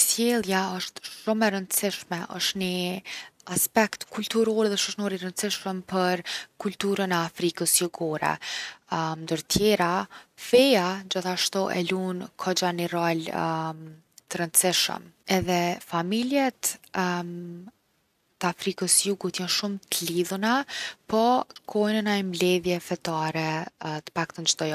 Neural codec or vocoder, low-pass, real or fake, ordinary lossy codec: none; 14.4 kHz; real; none